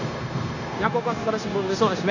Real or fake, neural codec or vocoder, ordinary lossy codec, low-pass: fake; codec, 16 kHz, 0.9 kbps, LongCat-Audio-Codec; none; 7.2 kHz